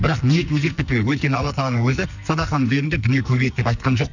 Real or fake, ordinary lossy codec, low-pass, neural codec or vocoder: fake; none; 7.2 kHz; codec, 44.1 kHz, 2.6 kbps, SNAC